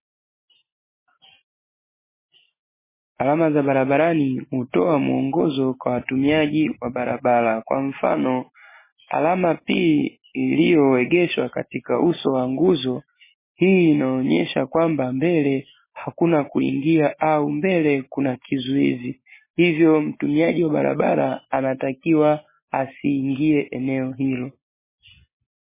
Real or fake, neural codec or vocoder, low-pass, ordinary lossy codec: real; none; 3.6 kHz; MP3, 16 kbps